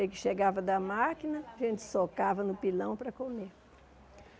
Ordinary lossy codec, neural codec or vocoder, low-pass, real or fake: none; none; none; real